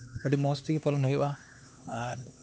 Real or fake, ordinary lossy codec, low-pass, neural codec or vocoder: fake; none; none; codec, 16 kHz, 2 kbps, X-Codec, HuBERT features, trained on LibriSpeech